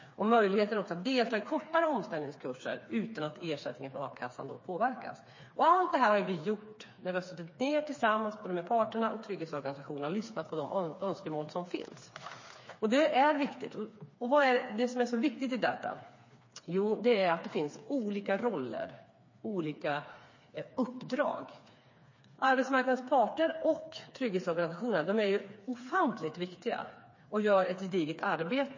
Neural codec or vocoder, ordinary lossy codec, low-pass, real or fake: codec, 16 kHz, 4 kbps, FreqCodec, smaller model; MP3, 32 kbps; 7.2 kHz; fake